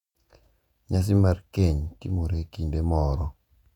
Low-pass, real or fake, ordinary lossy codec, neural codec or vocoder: 19.8 kHz; fake; none; vocoder, 48 kHz, 128 mel bands, Vocos